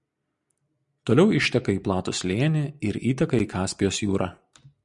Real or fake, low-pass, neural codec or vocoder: real; 10.8 kHz; none